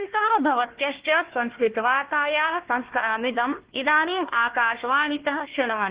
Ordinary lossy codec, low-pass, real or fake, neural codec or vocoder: Opus, 16 kbps; 3.6 kHz; fake; codec, 16 kHz, 1 kbps, FunCodec, trained on Chinese and English, 50 frames a second